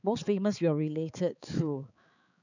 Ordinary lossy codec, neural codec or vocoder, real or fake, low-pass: none; codec, 16 kHz, 4 kbps, X-Codec, HuBERT features, trained on balanced general audio; fake; 7.2 kHz